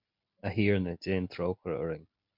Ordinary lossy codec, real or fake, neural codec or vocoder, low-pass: MP3, 48 kbps; real; none; 5.4 kHz